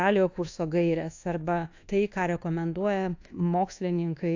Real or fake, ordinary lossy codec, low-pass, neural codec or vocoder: fake; AAC, 48 kbps; 7.2 kHz; codec, 24 kHz, 1.2 kbps, DualCodec